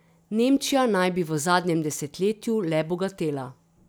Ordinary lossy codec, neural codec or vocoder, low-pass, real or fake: none; none; none; real